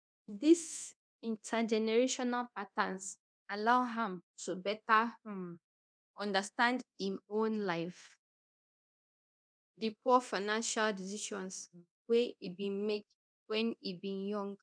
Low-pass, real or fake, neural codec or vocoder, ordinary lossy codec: 9.9 kHz; fake; codec, 24 kHz, 0.9 kbps, DualCodec; none